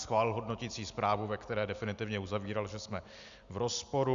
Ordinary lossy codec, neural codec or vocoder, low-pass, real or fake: Opus, 64 kbps; none; 7.2 kHz; real